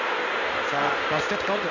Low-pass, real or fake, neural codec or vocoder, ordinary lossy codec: 7.2 kHz; fake; codec, 16 kHz in and 24 kHz out, 1 kbps, XY-Tokenizer; none